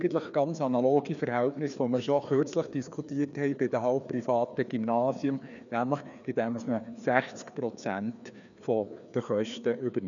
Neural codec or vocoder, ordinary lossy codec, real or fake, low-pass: codec, 16 kHz, 2 kbps, FreqCodec, larger model; none; fake; 7.2 kHz